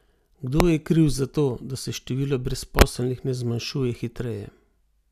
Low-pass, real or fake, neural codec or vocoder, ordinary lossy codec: 14.4 kHz; real; none; none